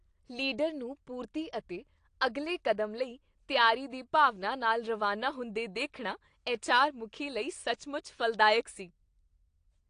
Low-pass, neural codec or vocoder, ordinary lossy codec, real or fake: 9.9 kHz; none; AAC, 48 kbps; real